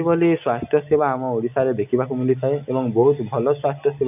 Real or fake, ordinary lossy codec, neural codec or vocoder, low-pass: real; none; none; 3.6 kHz